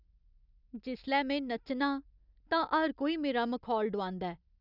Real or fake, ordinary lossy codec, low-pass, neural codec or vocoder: real; none; 5.4 kHz; none